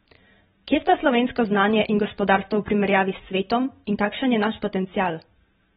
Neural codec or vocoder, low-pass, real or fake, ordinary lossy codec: vocoder, 44.1 kHz, 128 mel bands every 512 samples, BigVGAN v2; 19.8 kHz; fake; AAC, 16 kbps